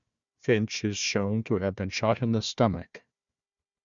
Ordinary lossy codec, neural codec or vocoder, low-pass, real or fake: Opus, 64 kbps; codec, 16 kHz, 1 kbps, FunCodec, trained on Chinese and English, 50 frames a second; 7.2 kHz; fake